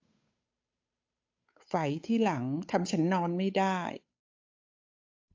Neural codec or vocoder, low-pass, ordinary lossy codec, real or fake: codec, 16 kHz, 8 kbps, FunCodec, trained on Chinese and English, 25 frames a second; 7.2 kHz; AAC, 48 kbps; fake